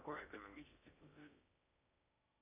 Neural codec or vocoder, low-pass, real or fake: codec, 16 kHz in and 24 kHz out, 0.6 kbps, FocalCodec, streaming, 4096 codes; 3.6 kHz; fake